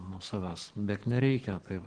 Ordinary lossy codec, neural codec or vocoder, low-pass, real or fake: Opus, 16 kbps; codec, 44.1 kHz, 7.8 kbps, Pupu-Codec; 9.9 kHz; fake